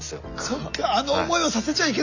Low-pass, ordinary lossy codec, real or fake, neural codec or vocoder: 7.2 kHz; Opus, 64 kbps; real; none